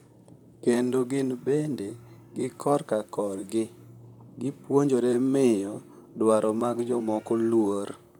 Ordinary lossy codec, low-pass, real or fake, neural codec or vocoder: none; 19.8 kHz; fake; vocoder, 44.1 kHz, 128 mel bands, Pupu-Vocoder